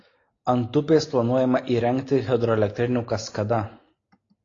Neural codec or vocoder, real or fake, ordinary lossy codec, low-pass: none; real; AAC, 32 kbps; 7.2 kHz